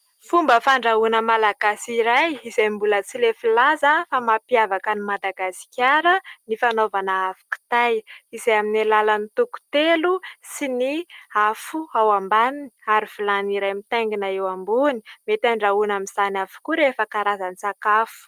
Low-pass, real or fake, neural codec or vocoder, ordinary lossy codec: 14.4 kHz; real; none; Opus, 32 kbps